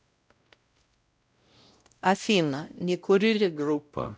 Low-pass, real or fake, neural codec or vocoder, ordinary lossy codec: none; fake; codec, 16 kHz, 0.5 kbps, X-Codec, WavLM features, trained on Multilingual LibriSpeech; none